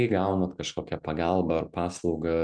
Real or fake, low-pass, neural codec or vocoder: real; 9.9 kHz; none